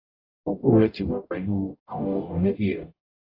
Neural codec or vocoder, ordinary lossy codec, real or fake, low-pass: codec, 44.1 kHz, 0.9 kbps, DAC; AAC, 32 kbps; fake; 5.4 kHz